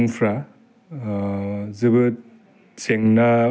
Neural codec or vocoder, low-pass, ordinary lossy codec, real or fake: none; none; none; real